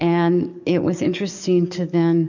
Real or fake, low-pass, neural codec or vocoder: fake; 7.2 kHz; codec, 44.1 kHz, 7.8 kbps, DAC